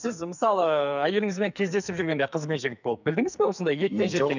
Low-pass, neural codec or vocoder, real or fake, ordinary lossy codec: 7.2 kHz; codec, 44.1 kHz, 2.6 kbps, SNAC; fake; none